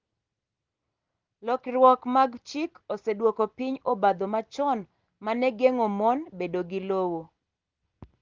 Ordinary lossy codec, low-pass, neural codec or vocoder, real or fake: Opus, 16 kbps; 7.2 kHz; none; real